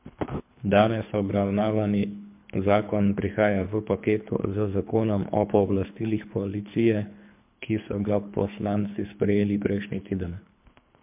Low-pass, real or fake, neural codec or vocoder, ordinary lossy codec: 3.6 kHz; fake; codec, 24 kHz, 3 kbps, HILCodec; MP3, 32 kbps